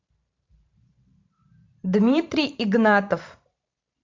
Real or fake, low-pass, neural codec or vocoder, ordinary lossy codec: fake; 7.2 kHz; vocoder, 44.1 kHz, 128 mel bands every 512 samples, BigVGAN v2; MP3, 48 kbps